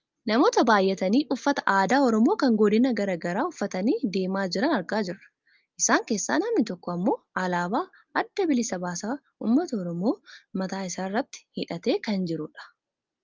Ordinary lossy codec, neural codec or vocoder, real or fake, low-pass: Opus, 24 kbps; none; real; 7.2 kHz